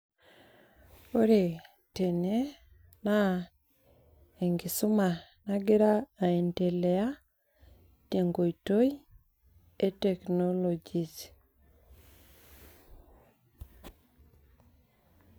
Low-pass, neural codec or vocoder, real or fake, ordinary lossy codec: none; none; real; none